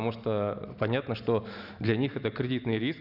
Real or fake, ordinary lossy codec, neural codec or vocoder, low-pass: real; none; none; 5.4 kHz